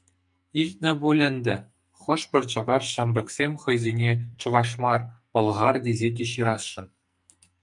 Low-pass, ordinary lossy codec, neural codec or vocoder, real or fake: 10.8 kHz; MP3, 96 kbps; codec, 44.1 kHz, 2.6 kbps, SNAC; fake